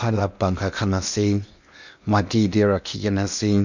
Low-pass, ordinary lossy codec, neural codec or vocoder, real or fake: 7.2 kHz; none; codec, 16 kHz in and 24 kHz out, 0.8 kbps, FocalCodec, streaming, 65536 codes; fake